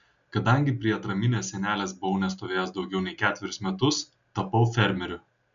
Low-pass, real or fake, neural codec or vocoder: 7.2 kHz; real; none